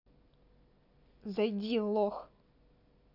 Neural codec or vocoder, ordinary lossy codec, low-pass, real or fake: none; none; 5.4 kHz; real